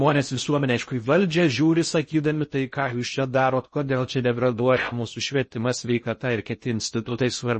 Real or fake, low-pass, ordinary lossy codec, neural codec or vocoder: fake; 10.8 kHz; MP3, 32 kbps; codec, 16 kHz in and 24 kHz out, 0.6 kbps, FocalCodec, streaming, 2048 codes